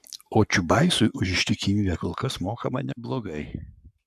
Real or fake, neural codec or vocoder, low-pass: fake; codec, 44.1 kHz, 7.8 kbps, Pupu-Codec; 14.4 kHz